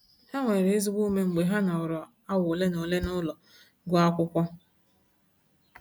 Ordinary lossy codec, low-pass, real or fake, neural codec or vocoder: none; none; real; none